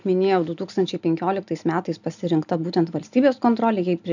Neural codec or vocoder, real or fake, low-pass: none; real; 7.2 kHz